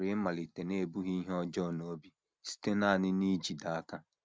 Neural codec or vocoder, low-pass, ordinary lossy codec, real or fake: none; none; none; real